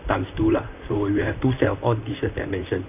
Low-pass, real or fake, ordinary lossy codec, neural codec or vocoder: 3.6 kHz; fake; none; vocoder, 44.1 kHz, 128 mel bands, Pupu-Vocoder